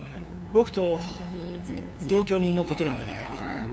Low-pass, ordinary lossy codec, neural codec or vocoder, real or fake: none; none; codec, 16 kHz, 2 kbps, FunCodec, trained on LibriTTS, 25 frames a second; fake